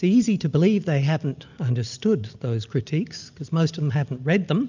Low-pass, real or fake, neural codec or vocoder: 7.2 kHz; real; none